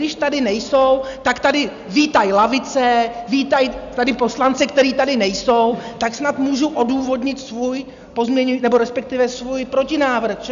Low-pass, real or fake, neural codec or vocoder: 7.2 kHz; real; none